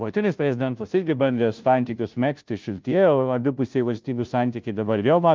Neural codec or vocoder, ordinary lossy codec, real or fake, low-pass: codec, 16 kHz, 0.5 kbps, FunCodec, trained on Chinese and English, 25 frames a second; Opus, 24 kbps; fake; 7.2 kHz